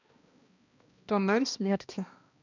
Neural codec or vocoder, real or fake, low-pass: codec, 16 kHz, 1 kbps, X-Codec, HuBERT features, trained on balanced general audio; fake; 7.2 kHz